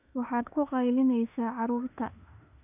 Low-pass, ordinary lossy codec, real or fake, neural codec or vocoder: 3.6 kHz; none; fake; autoencoder, 48 kHz, 32 numbers a frame, DAC-VAE, trained on Japanese speech